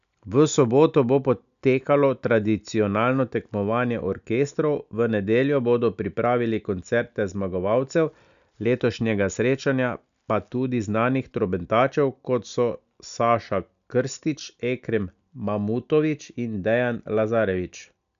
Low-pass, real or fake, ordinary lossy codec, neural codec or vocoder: 7.2 kHz; real; none; none